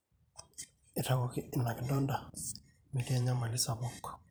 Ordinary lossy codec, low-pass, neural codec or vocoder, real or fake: none; none; vocoder, 44.1 kHz, 128 mel bands every 512 samples, BigVGAN v2; fake